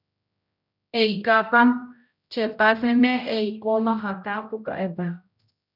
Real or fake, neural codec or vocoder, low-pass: fake; codec, 16 kHz, 0.5 kbps, X-Codec, HuBERT features, trained on general audio; 5.4 kHz